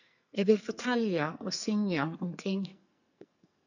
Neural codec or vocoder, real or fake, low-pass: codec, 44.1 kHz, 2.6 kbps, SNAC; fake; 7.2 kHz